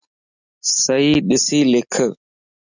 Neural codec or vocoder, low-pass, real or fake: none; 7.2 kHz; real